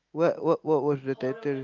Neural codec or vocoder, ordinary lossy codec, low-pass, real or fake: none; Opus, 32 kbps; 7.2 kHz; real